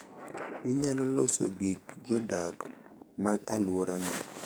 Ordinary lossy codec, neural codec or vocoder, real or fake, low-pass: none; codec, 44.1 kHz, 2.6 kbps, SNAC; fake; none